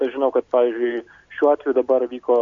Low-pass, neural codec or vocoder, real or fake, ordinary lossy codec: 7.2 kHz; none; real; MP3, 48 kbps